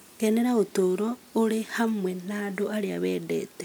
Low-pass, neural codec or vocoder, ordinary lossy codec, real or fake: none; none; none; real